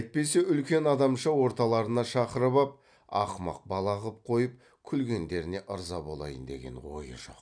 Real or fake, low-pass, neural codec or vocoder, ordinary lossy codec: real; 9.9 kHz; none; none